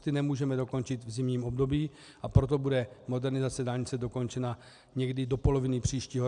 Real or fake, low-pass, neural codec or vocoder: real; 9.9 kHz; none